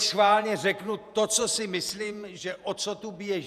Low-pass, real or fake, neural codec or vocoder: 14.4 kHz; fake; vocoder, 48 kHz, 128 mel bands, Vocos